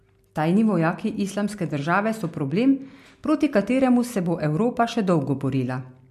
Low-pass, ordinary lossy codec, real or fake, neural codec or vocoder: 14.4 kHz; MP3, 64 kbps; real; none